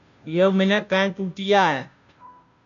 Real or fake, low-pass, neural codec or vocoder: fake; 7.2 kHz; codec, 16 kHz, 0.5 kbps, FunCodec, trained on Chinese and English, 25 frames a second